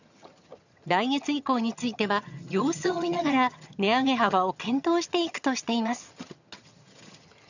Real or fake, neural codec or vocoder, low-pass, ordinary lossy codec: fake; vocoder, 22.05 kHz, 80 mel bands, HiFi-GAN; 7.2 kHz; none